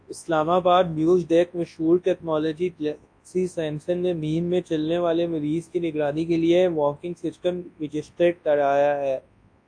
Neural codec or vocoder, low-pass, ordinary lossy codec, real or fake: codec, 24 kHz, 0.9 kbps, WavTokenizer, large speech release; 9.9 kHz; AAC, 48 kbps; fake